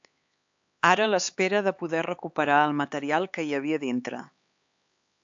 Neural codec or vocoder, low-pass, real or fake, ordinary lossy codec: codec, 16 kHz, 4 kbps, X-Codec, HuBERT features, trained on LibriSpeech; 7.2 kHz; fake; AAC, 64 kbps